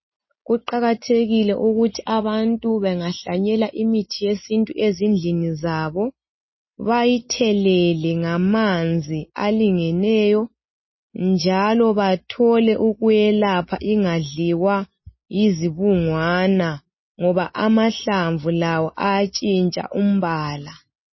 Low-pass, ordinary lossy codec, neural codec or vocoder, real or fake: 7.2 kHz; MP3, 24 kbps; none; real